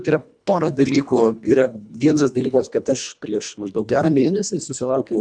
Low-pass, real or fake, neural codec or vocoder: 9.9 kHz; fake; codec, 24 kHz, 1.5 kbps, HILCodec